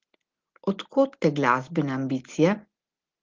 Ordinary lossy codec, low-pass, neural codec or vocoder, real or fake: Opus, 16 kbps; 7.2 kHz; none; real